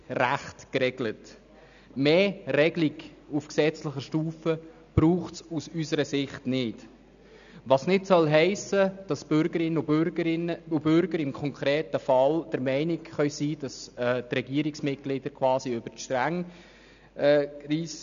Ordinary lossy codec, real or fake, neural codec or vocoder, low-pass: none; real; none; 7.2 kHz